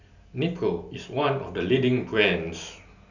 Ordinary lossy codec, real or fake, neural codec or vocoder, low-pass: none; real; none; 7.2 kHz